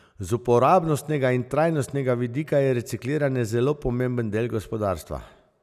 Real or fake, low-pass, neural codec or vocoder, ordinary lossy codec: real; 14.4 kHz; none; none